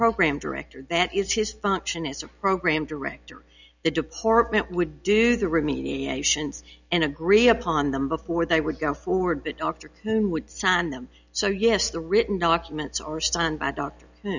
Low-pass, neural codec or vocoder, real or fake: 7.2 kHz; none; real